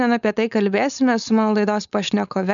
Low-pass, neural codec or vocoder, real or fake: 7.2 kHz; codec, 16 kHz, 4.8 kbps, FACodec; fake